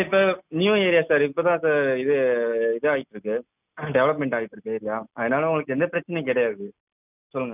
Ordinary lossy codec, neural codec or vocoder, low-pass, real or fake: none; none; 3.6 kHz; real